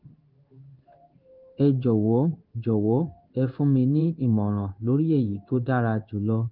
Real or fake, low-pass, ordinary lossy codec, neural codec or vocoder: fake; 5.4 kHz; Opus, 24 kbps; codec, 16 kHz in and 24 kHz out, 1 kbps, XY-Tokenizer